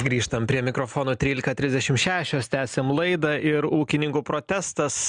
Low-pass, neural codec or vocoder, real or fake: 9.9 kHz; none; real